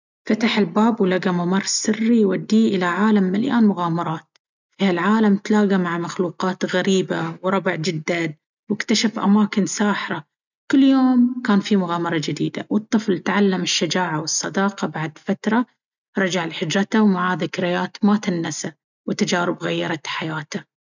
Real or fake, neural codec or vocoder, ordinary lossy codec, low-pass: real; none; none; 7.2 kHz